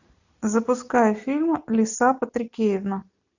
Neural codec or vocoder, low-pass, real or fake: none; 7.2 kHz; real